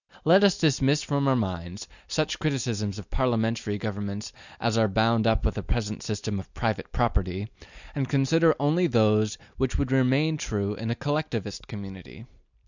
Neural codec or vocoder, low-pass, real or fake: none; 7.2 kHz; real